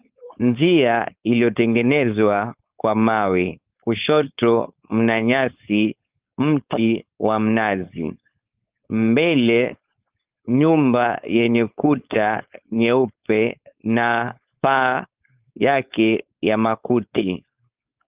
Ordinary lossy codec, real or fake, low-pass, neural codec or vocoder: Opus, 24 kbps; fake; 3.6 kHz; codec, 16 kHz, 4.8 kbps, FACodec